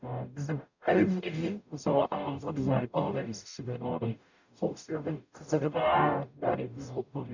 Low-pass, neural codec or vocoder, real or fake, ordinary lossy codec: 7.2 kHz; codec, 44.1 kHz, 0.9 kbps, DAC; fake; none